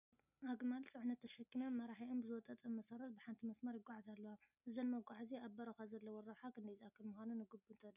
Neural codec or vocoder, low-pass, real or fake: none; 3.6 kHz; real